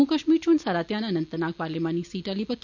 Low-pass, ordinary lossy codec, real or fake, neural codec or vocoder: 7.2 kHz; none; real; none